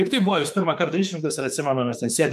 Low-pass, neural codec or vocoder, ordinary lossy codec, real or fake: 14.4 kHz; autoencoder, 48 kHz, 32 numbers a frame, DAC-VAE, trained on Japanese speech; MP3, 96 kbps; fake